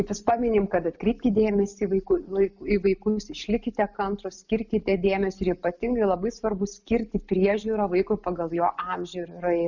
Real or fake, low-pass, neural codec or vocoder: real; 7.2 kHz; none